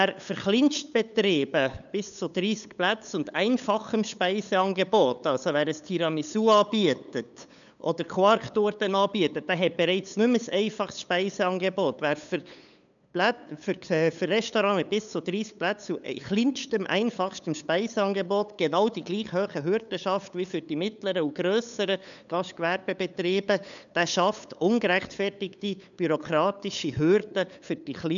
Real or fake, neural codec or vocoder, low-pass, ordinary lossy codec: fake; codec, 16 kHz, 8 kbps, FunCodec, trained on LibriTTS, 25 frames a second; 7.2 kHz; none